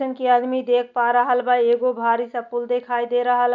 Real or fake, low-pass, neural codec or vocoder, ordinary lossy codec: real; 7.2 kHz; none; none